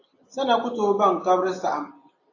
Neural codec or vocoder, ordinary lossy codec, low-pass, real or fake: none; AAC, 48 kbps; 7.2 kHz; real